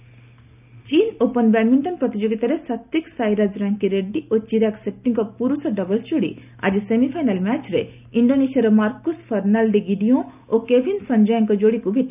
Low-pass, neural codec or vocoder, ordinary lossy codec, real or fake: 3.6 kHz; none; none; real